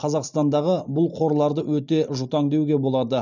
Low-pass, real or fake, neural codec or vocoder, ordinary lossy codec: 7.2 kHz; real; none; none